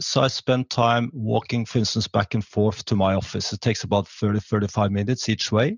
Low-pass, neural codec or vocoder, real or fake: 7.2 kHz; none; real